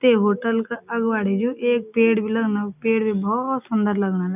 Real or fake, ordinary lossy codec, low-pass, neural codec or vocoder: real; none; 3.6 kHz; none